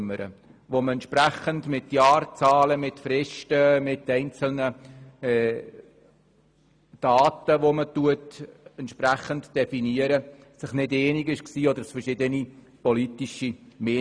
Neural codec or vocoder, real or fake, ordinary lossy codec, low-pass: none; real; Opus, 64 kbps; 9.9 kHz